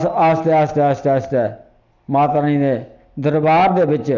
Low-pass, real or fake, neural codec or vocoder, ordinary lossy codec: 7.2 kHz; real; none; none